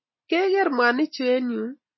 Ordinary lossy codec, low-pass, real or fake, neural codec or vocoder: MP3, 24 kbps; 7.2 kHz; real; none